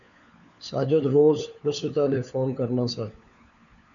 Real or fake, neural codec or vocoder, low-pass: fake; codec, 16 kHz, 4 kbps, FunCodec, trained on LibriTTS, 50 frames a second; 7.2 kHz